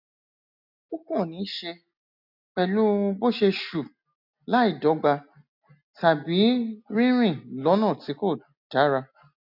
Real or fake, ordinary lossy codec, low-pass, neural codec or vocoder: real; none; 5.4 kHz; none